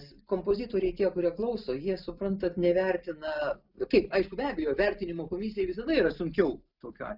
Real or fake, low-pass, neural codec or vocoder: real; 5.4 kHz; none